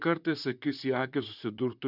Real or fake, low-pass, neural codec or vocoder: fake; 5.4 kHz; vocoder, 44.1 kHz, 128 mel bands, Pupu-Vocoder